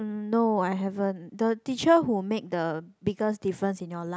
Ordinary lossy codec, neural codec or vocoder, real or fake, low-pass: none; none; real; none